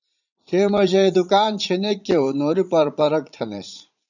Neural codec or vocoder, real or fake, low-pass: vocoder, 44.1 kHz, 80 mel bands, Vocos; fake; 7.2 kHz